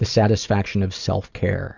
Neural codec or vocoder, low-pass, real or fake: none; 7.2 kHz; real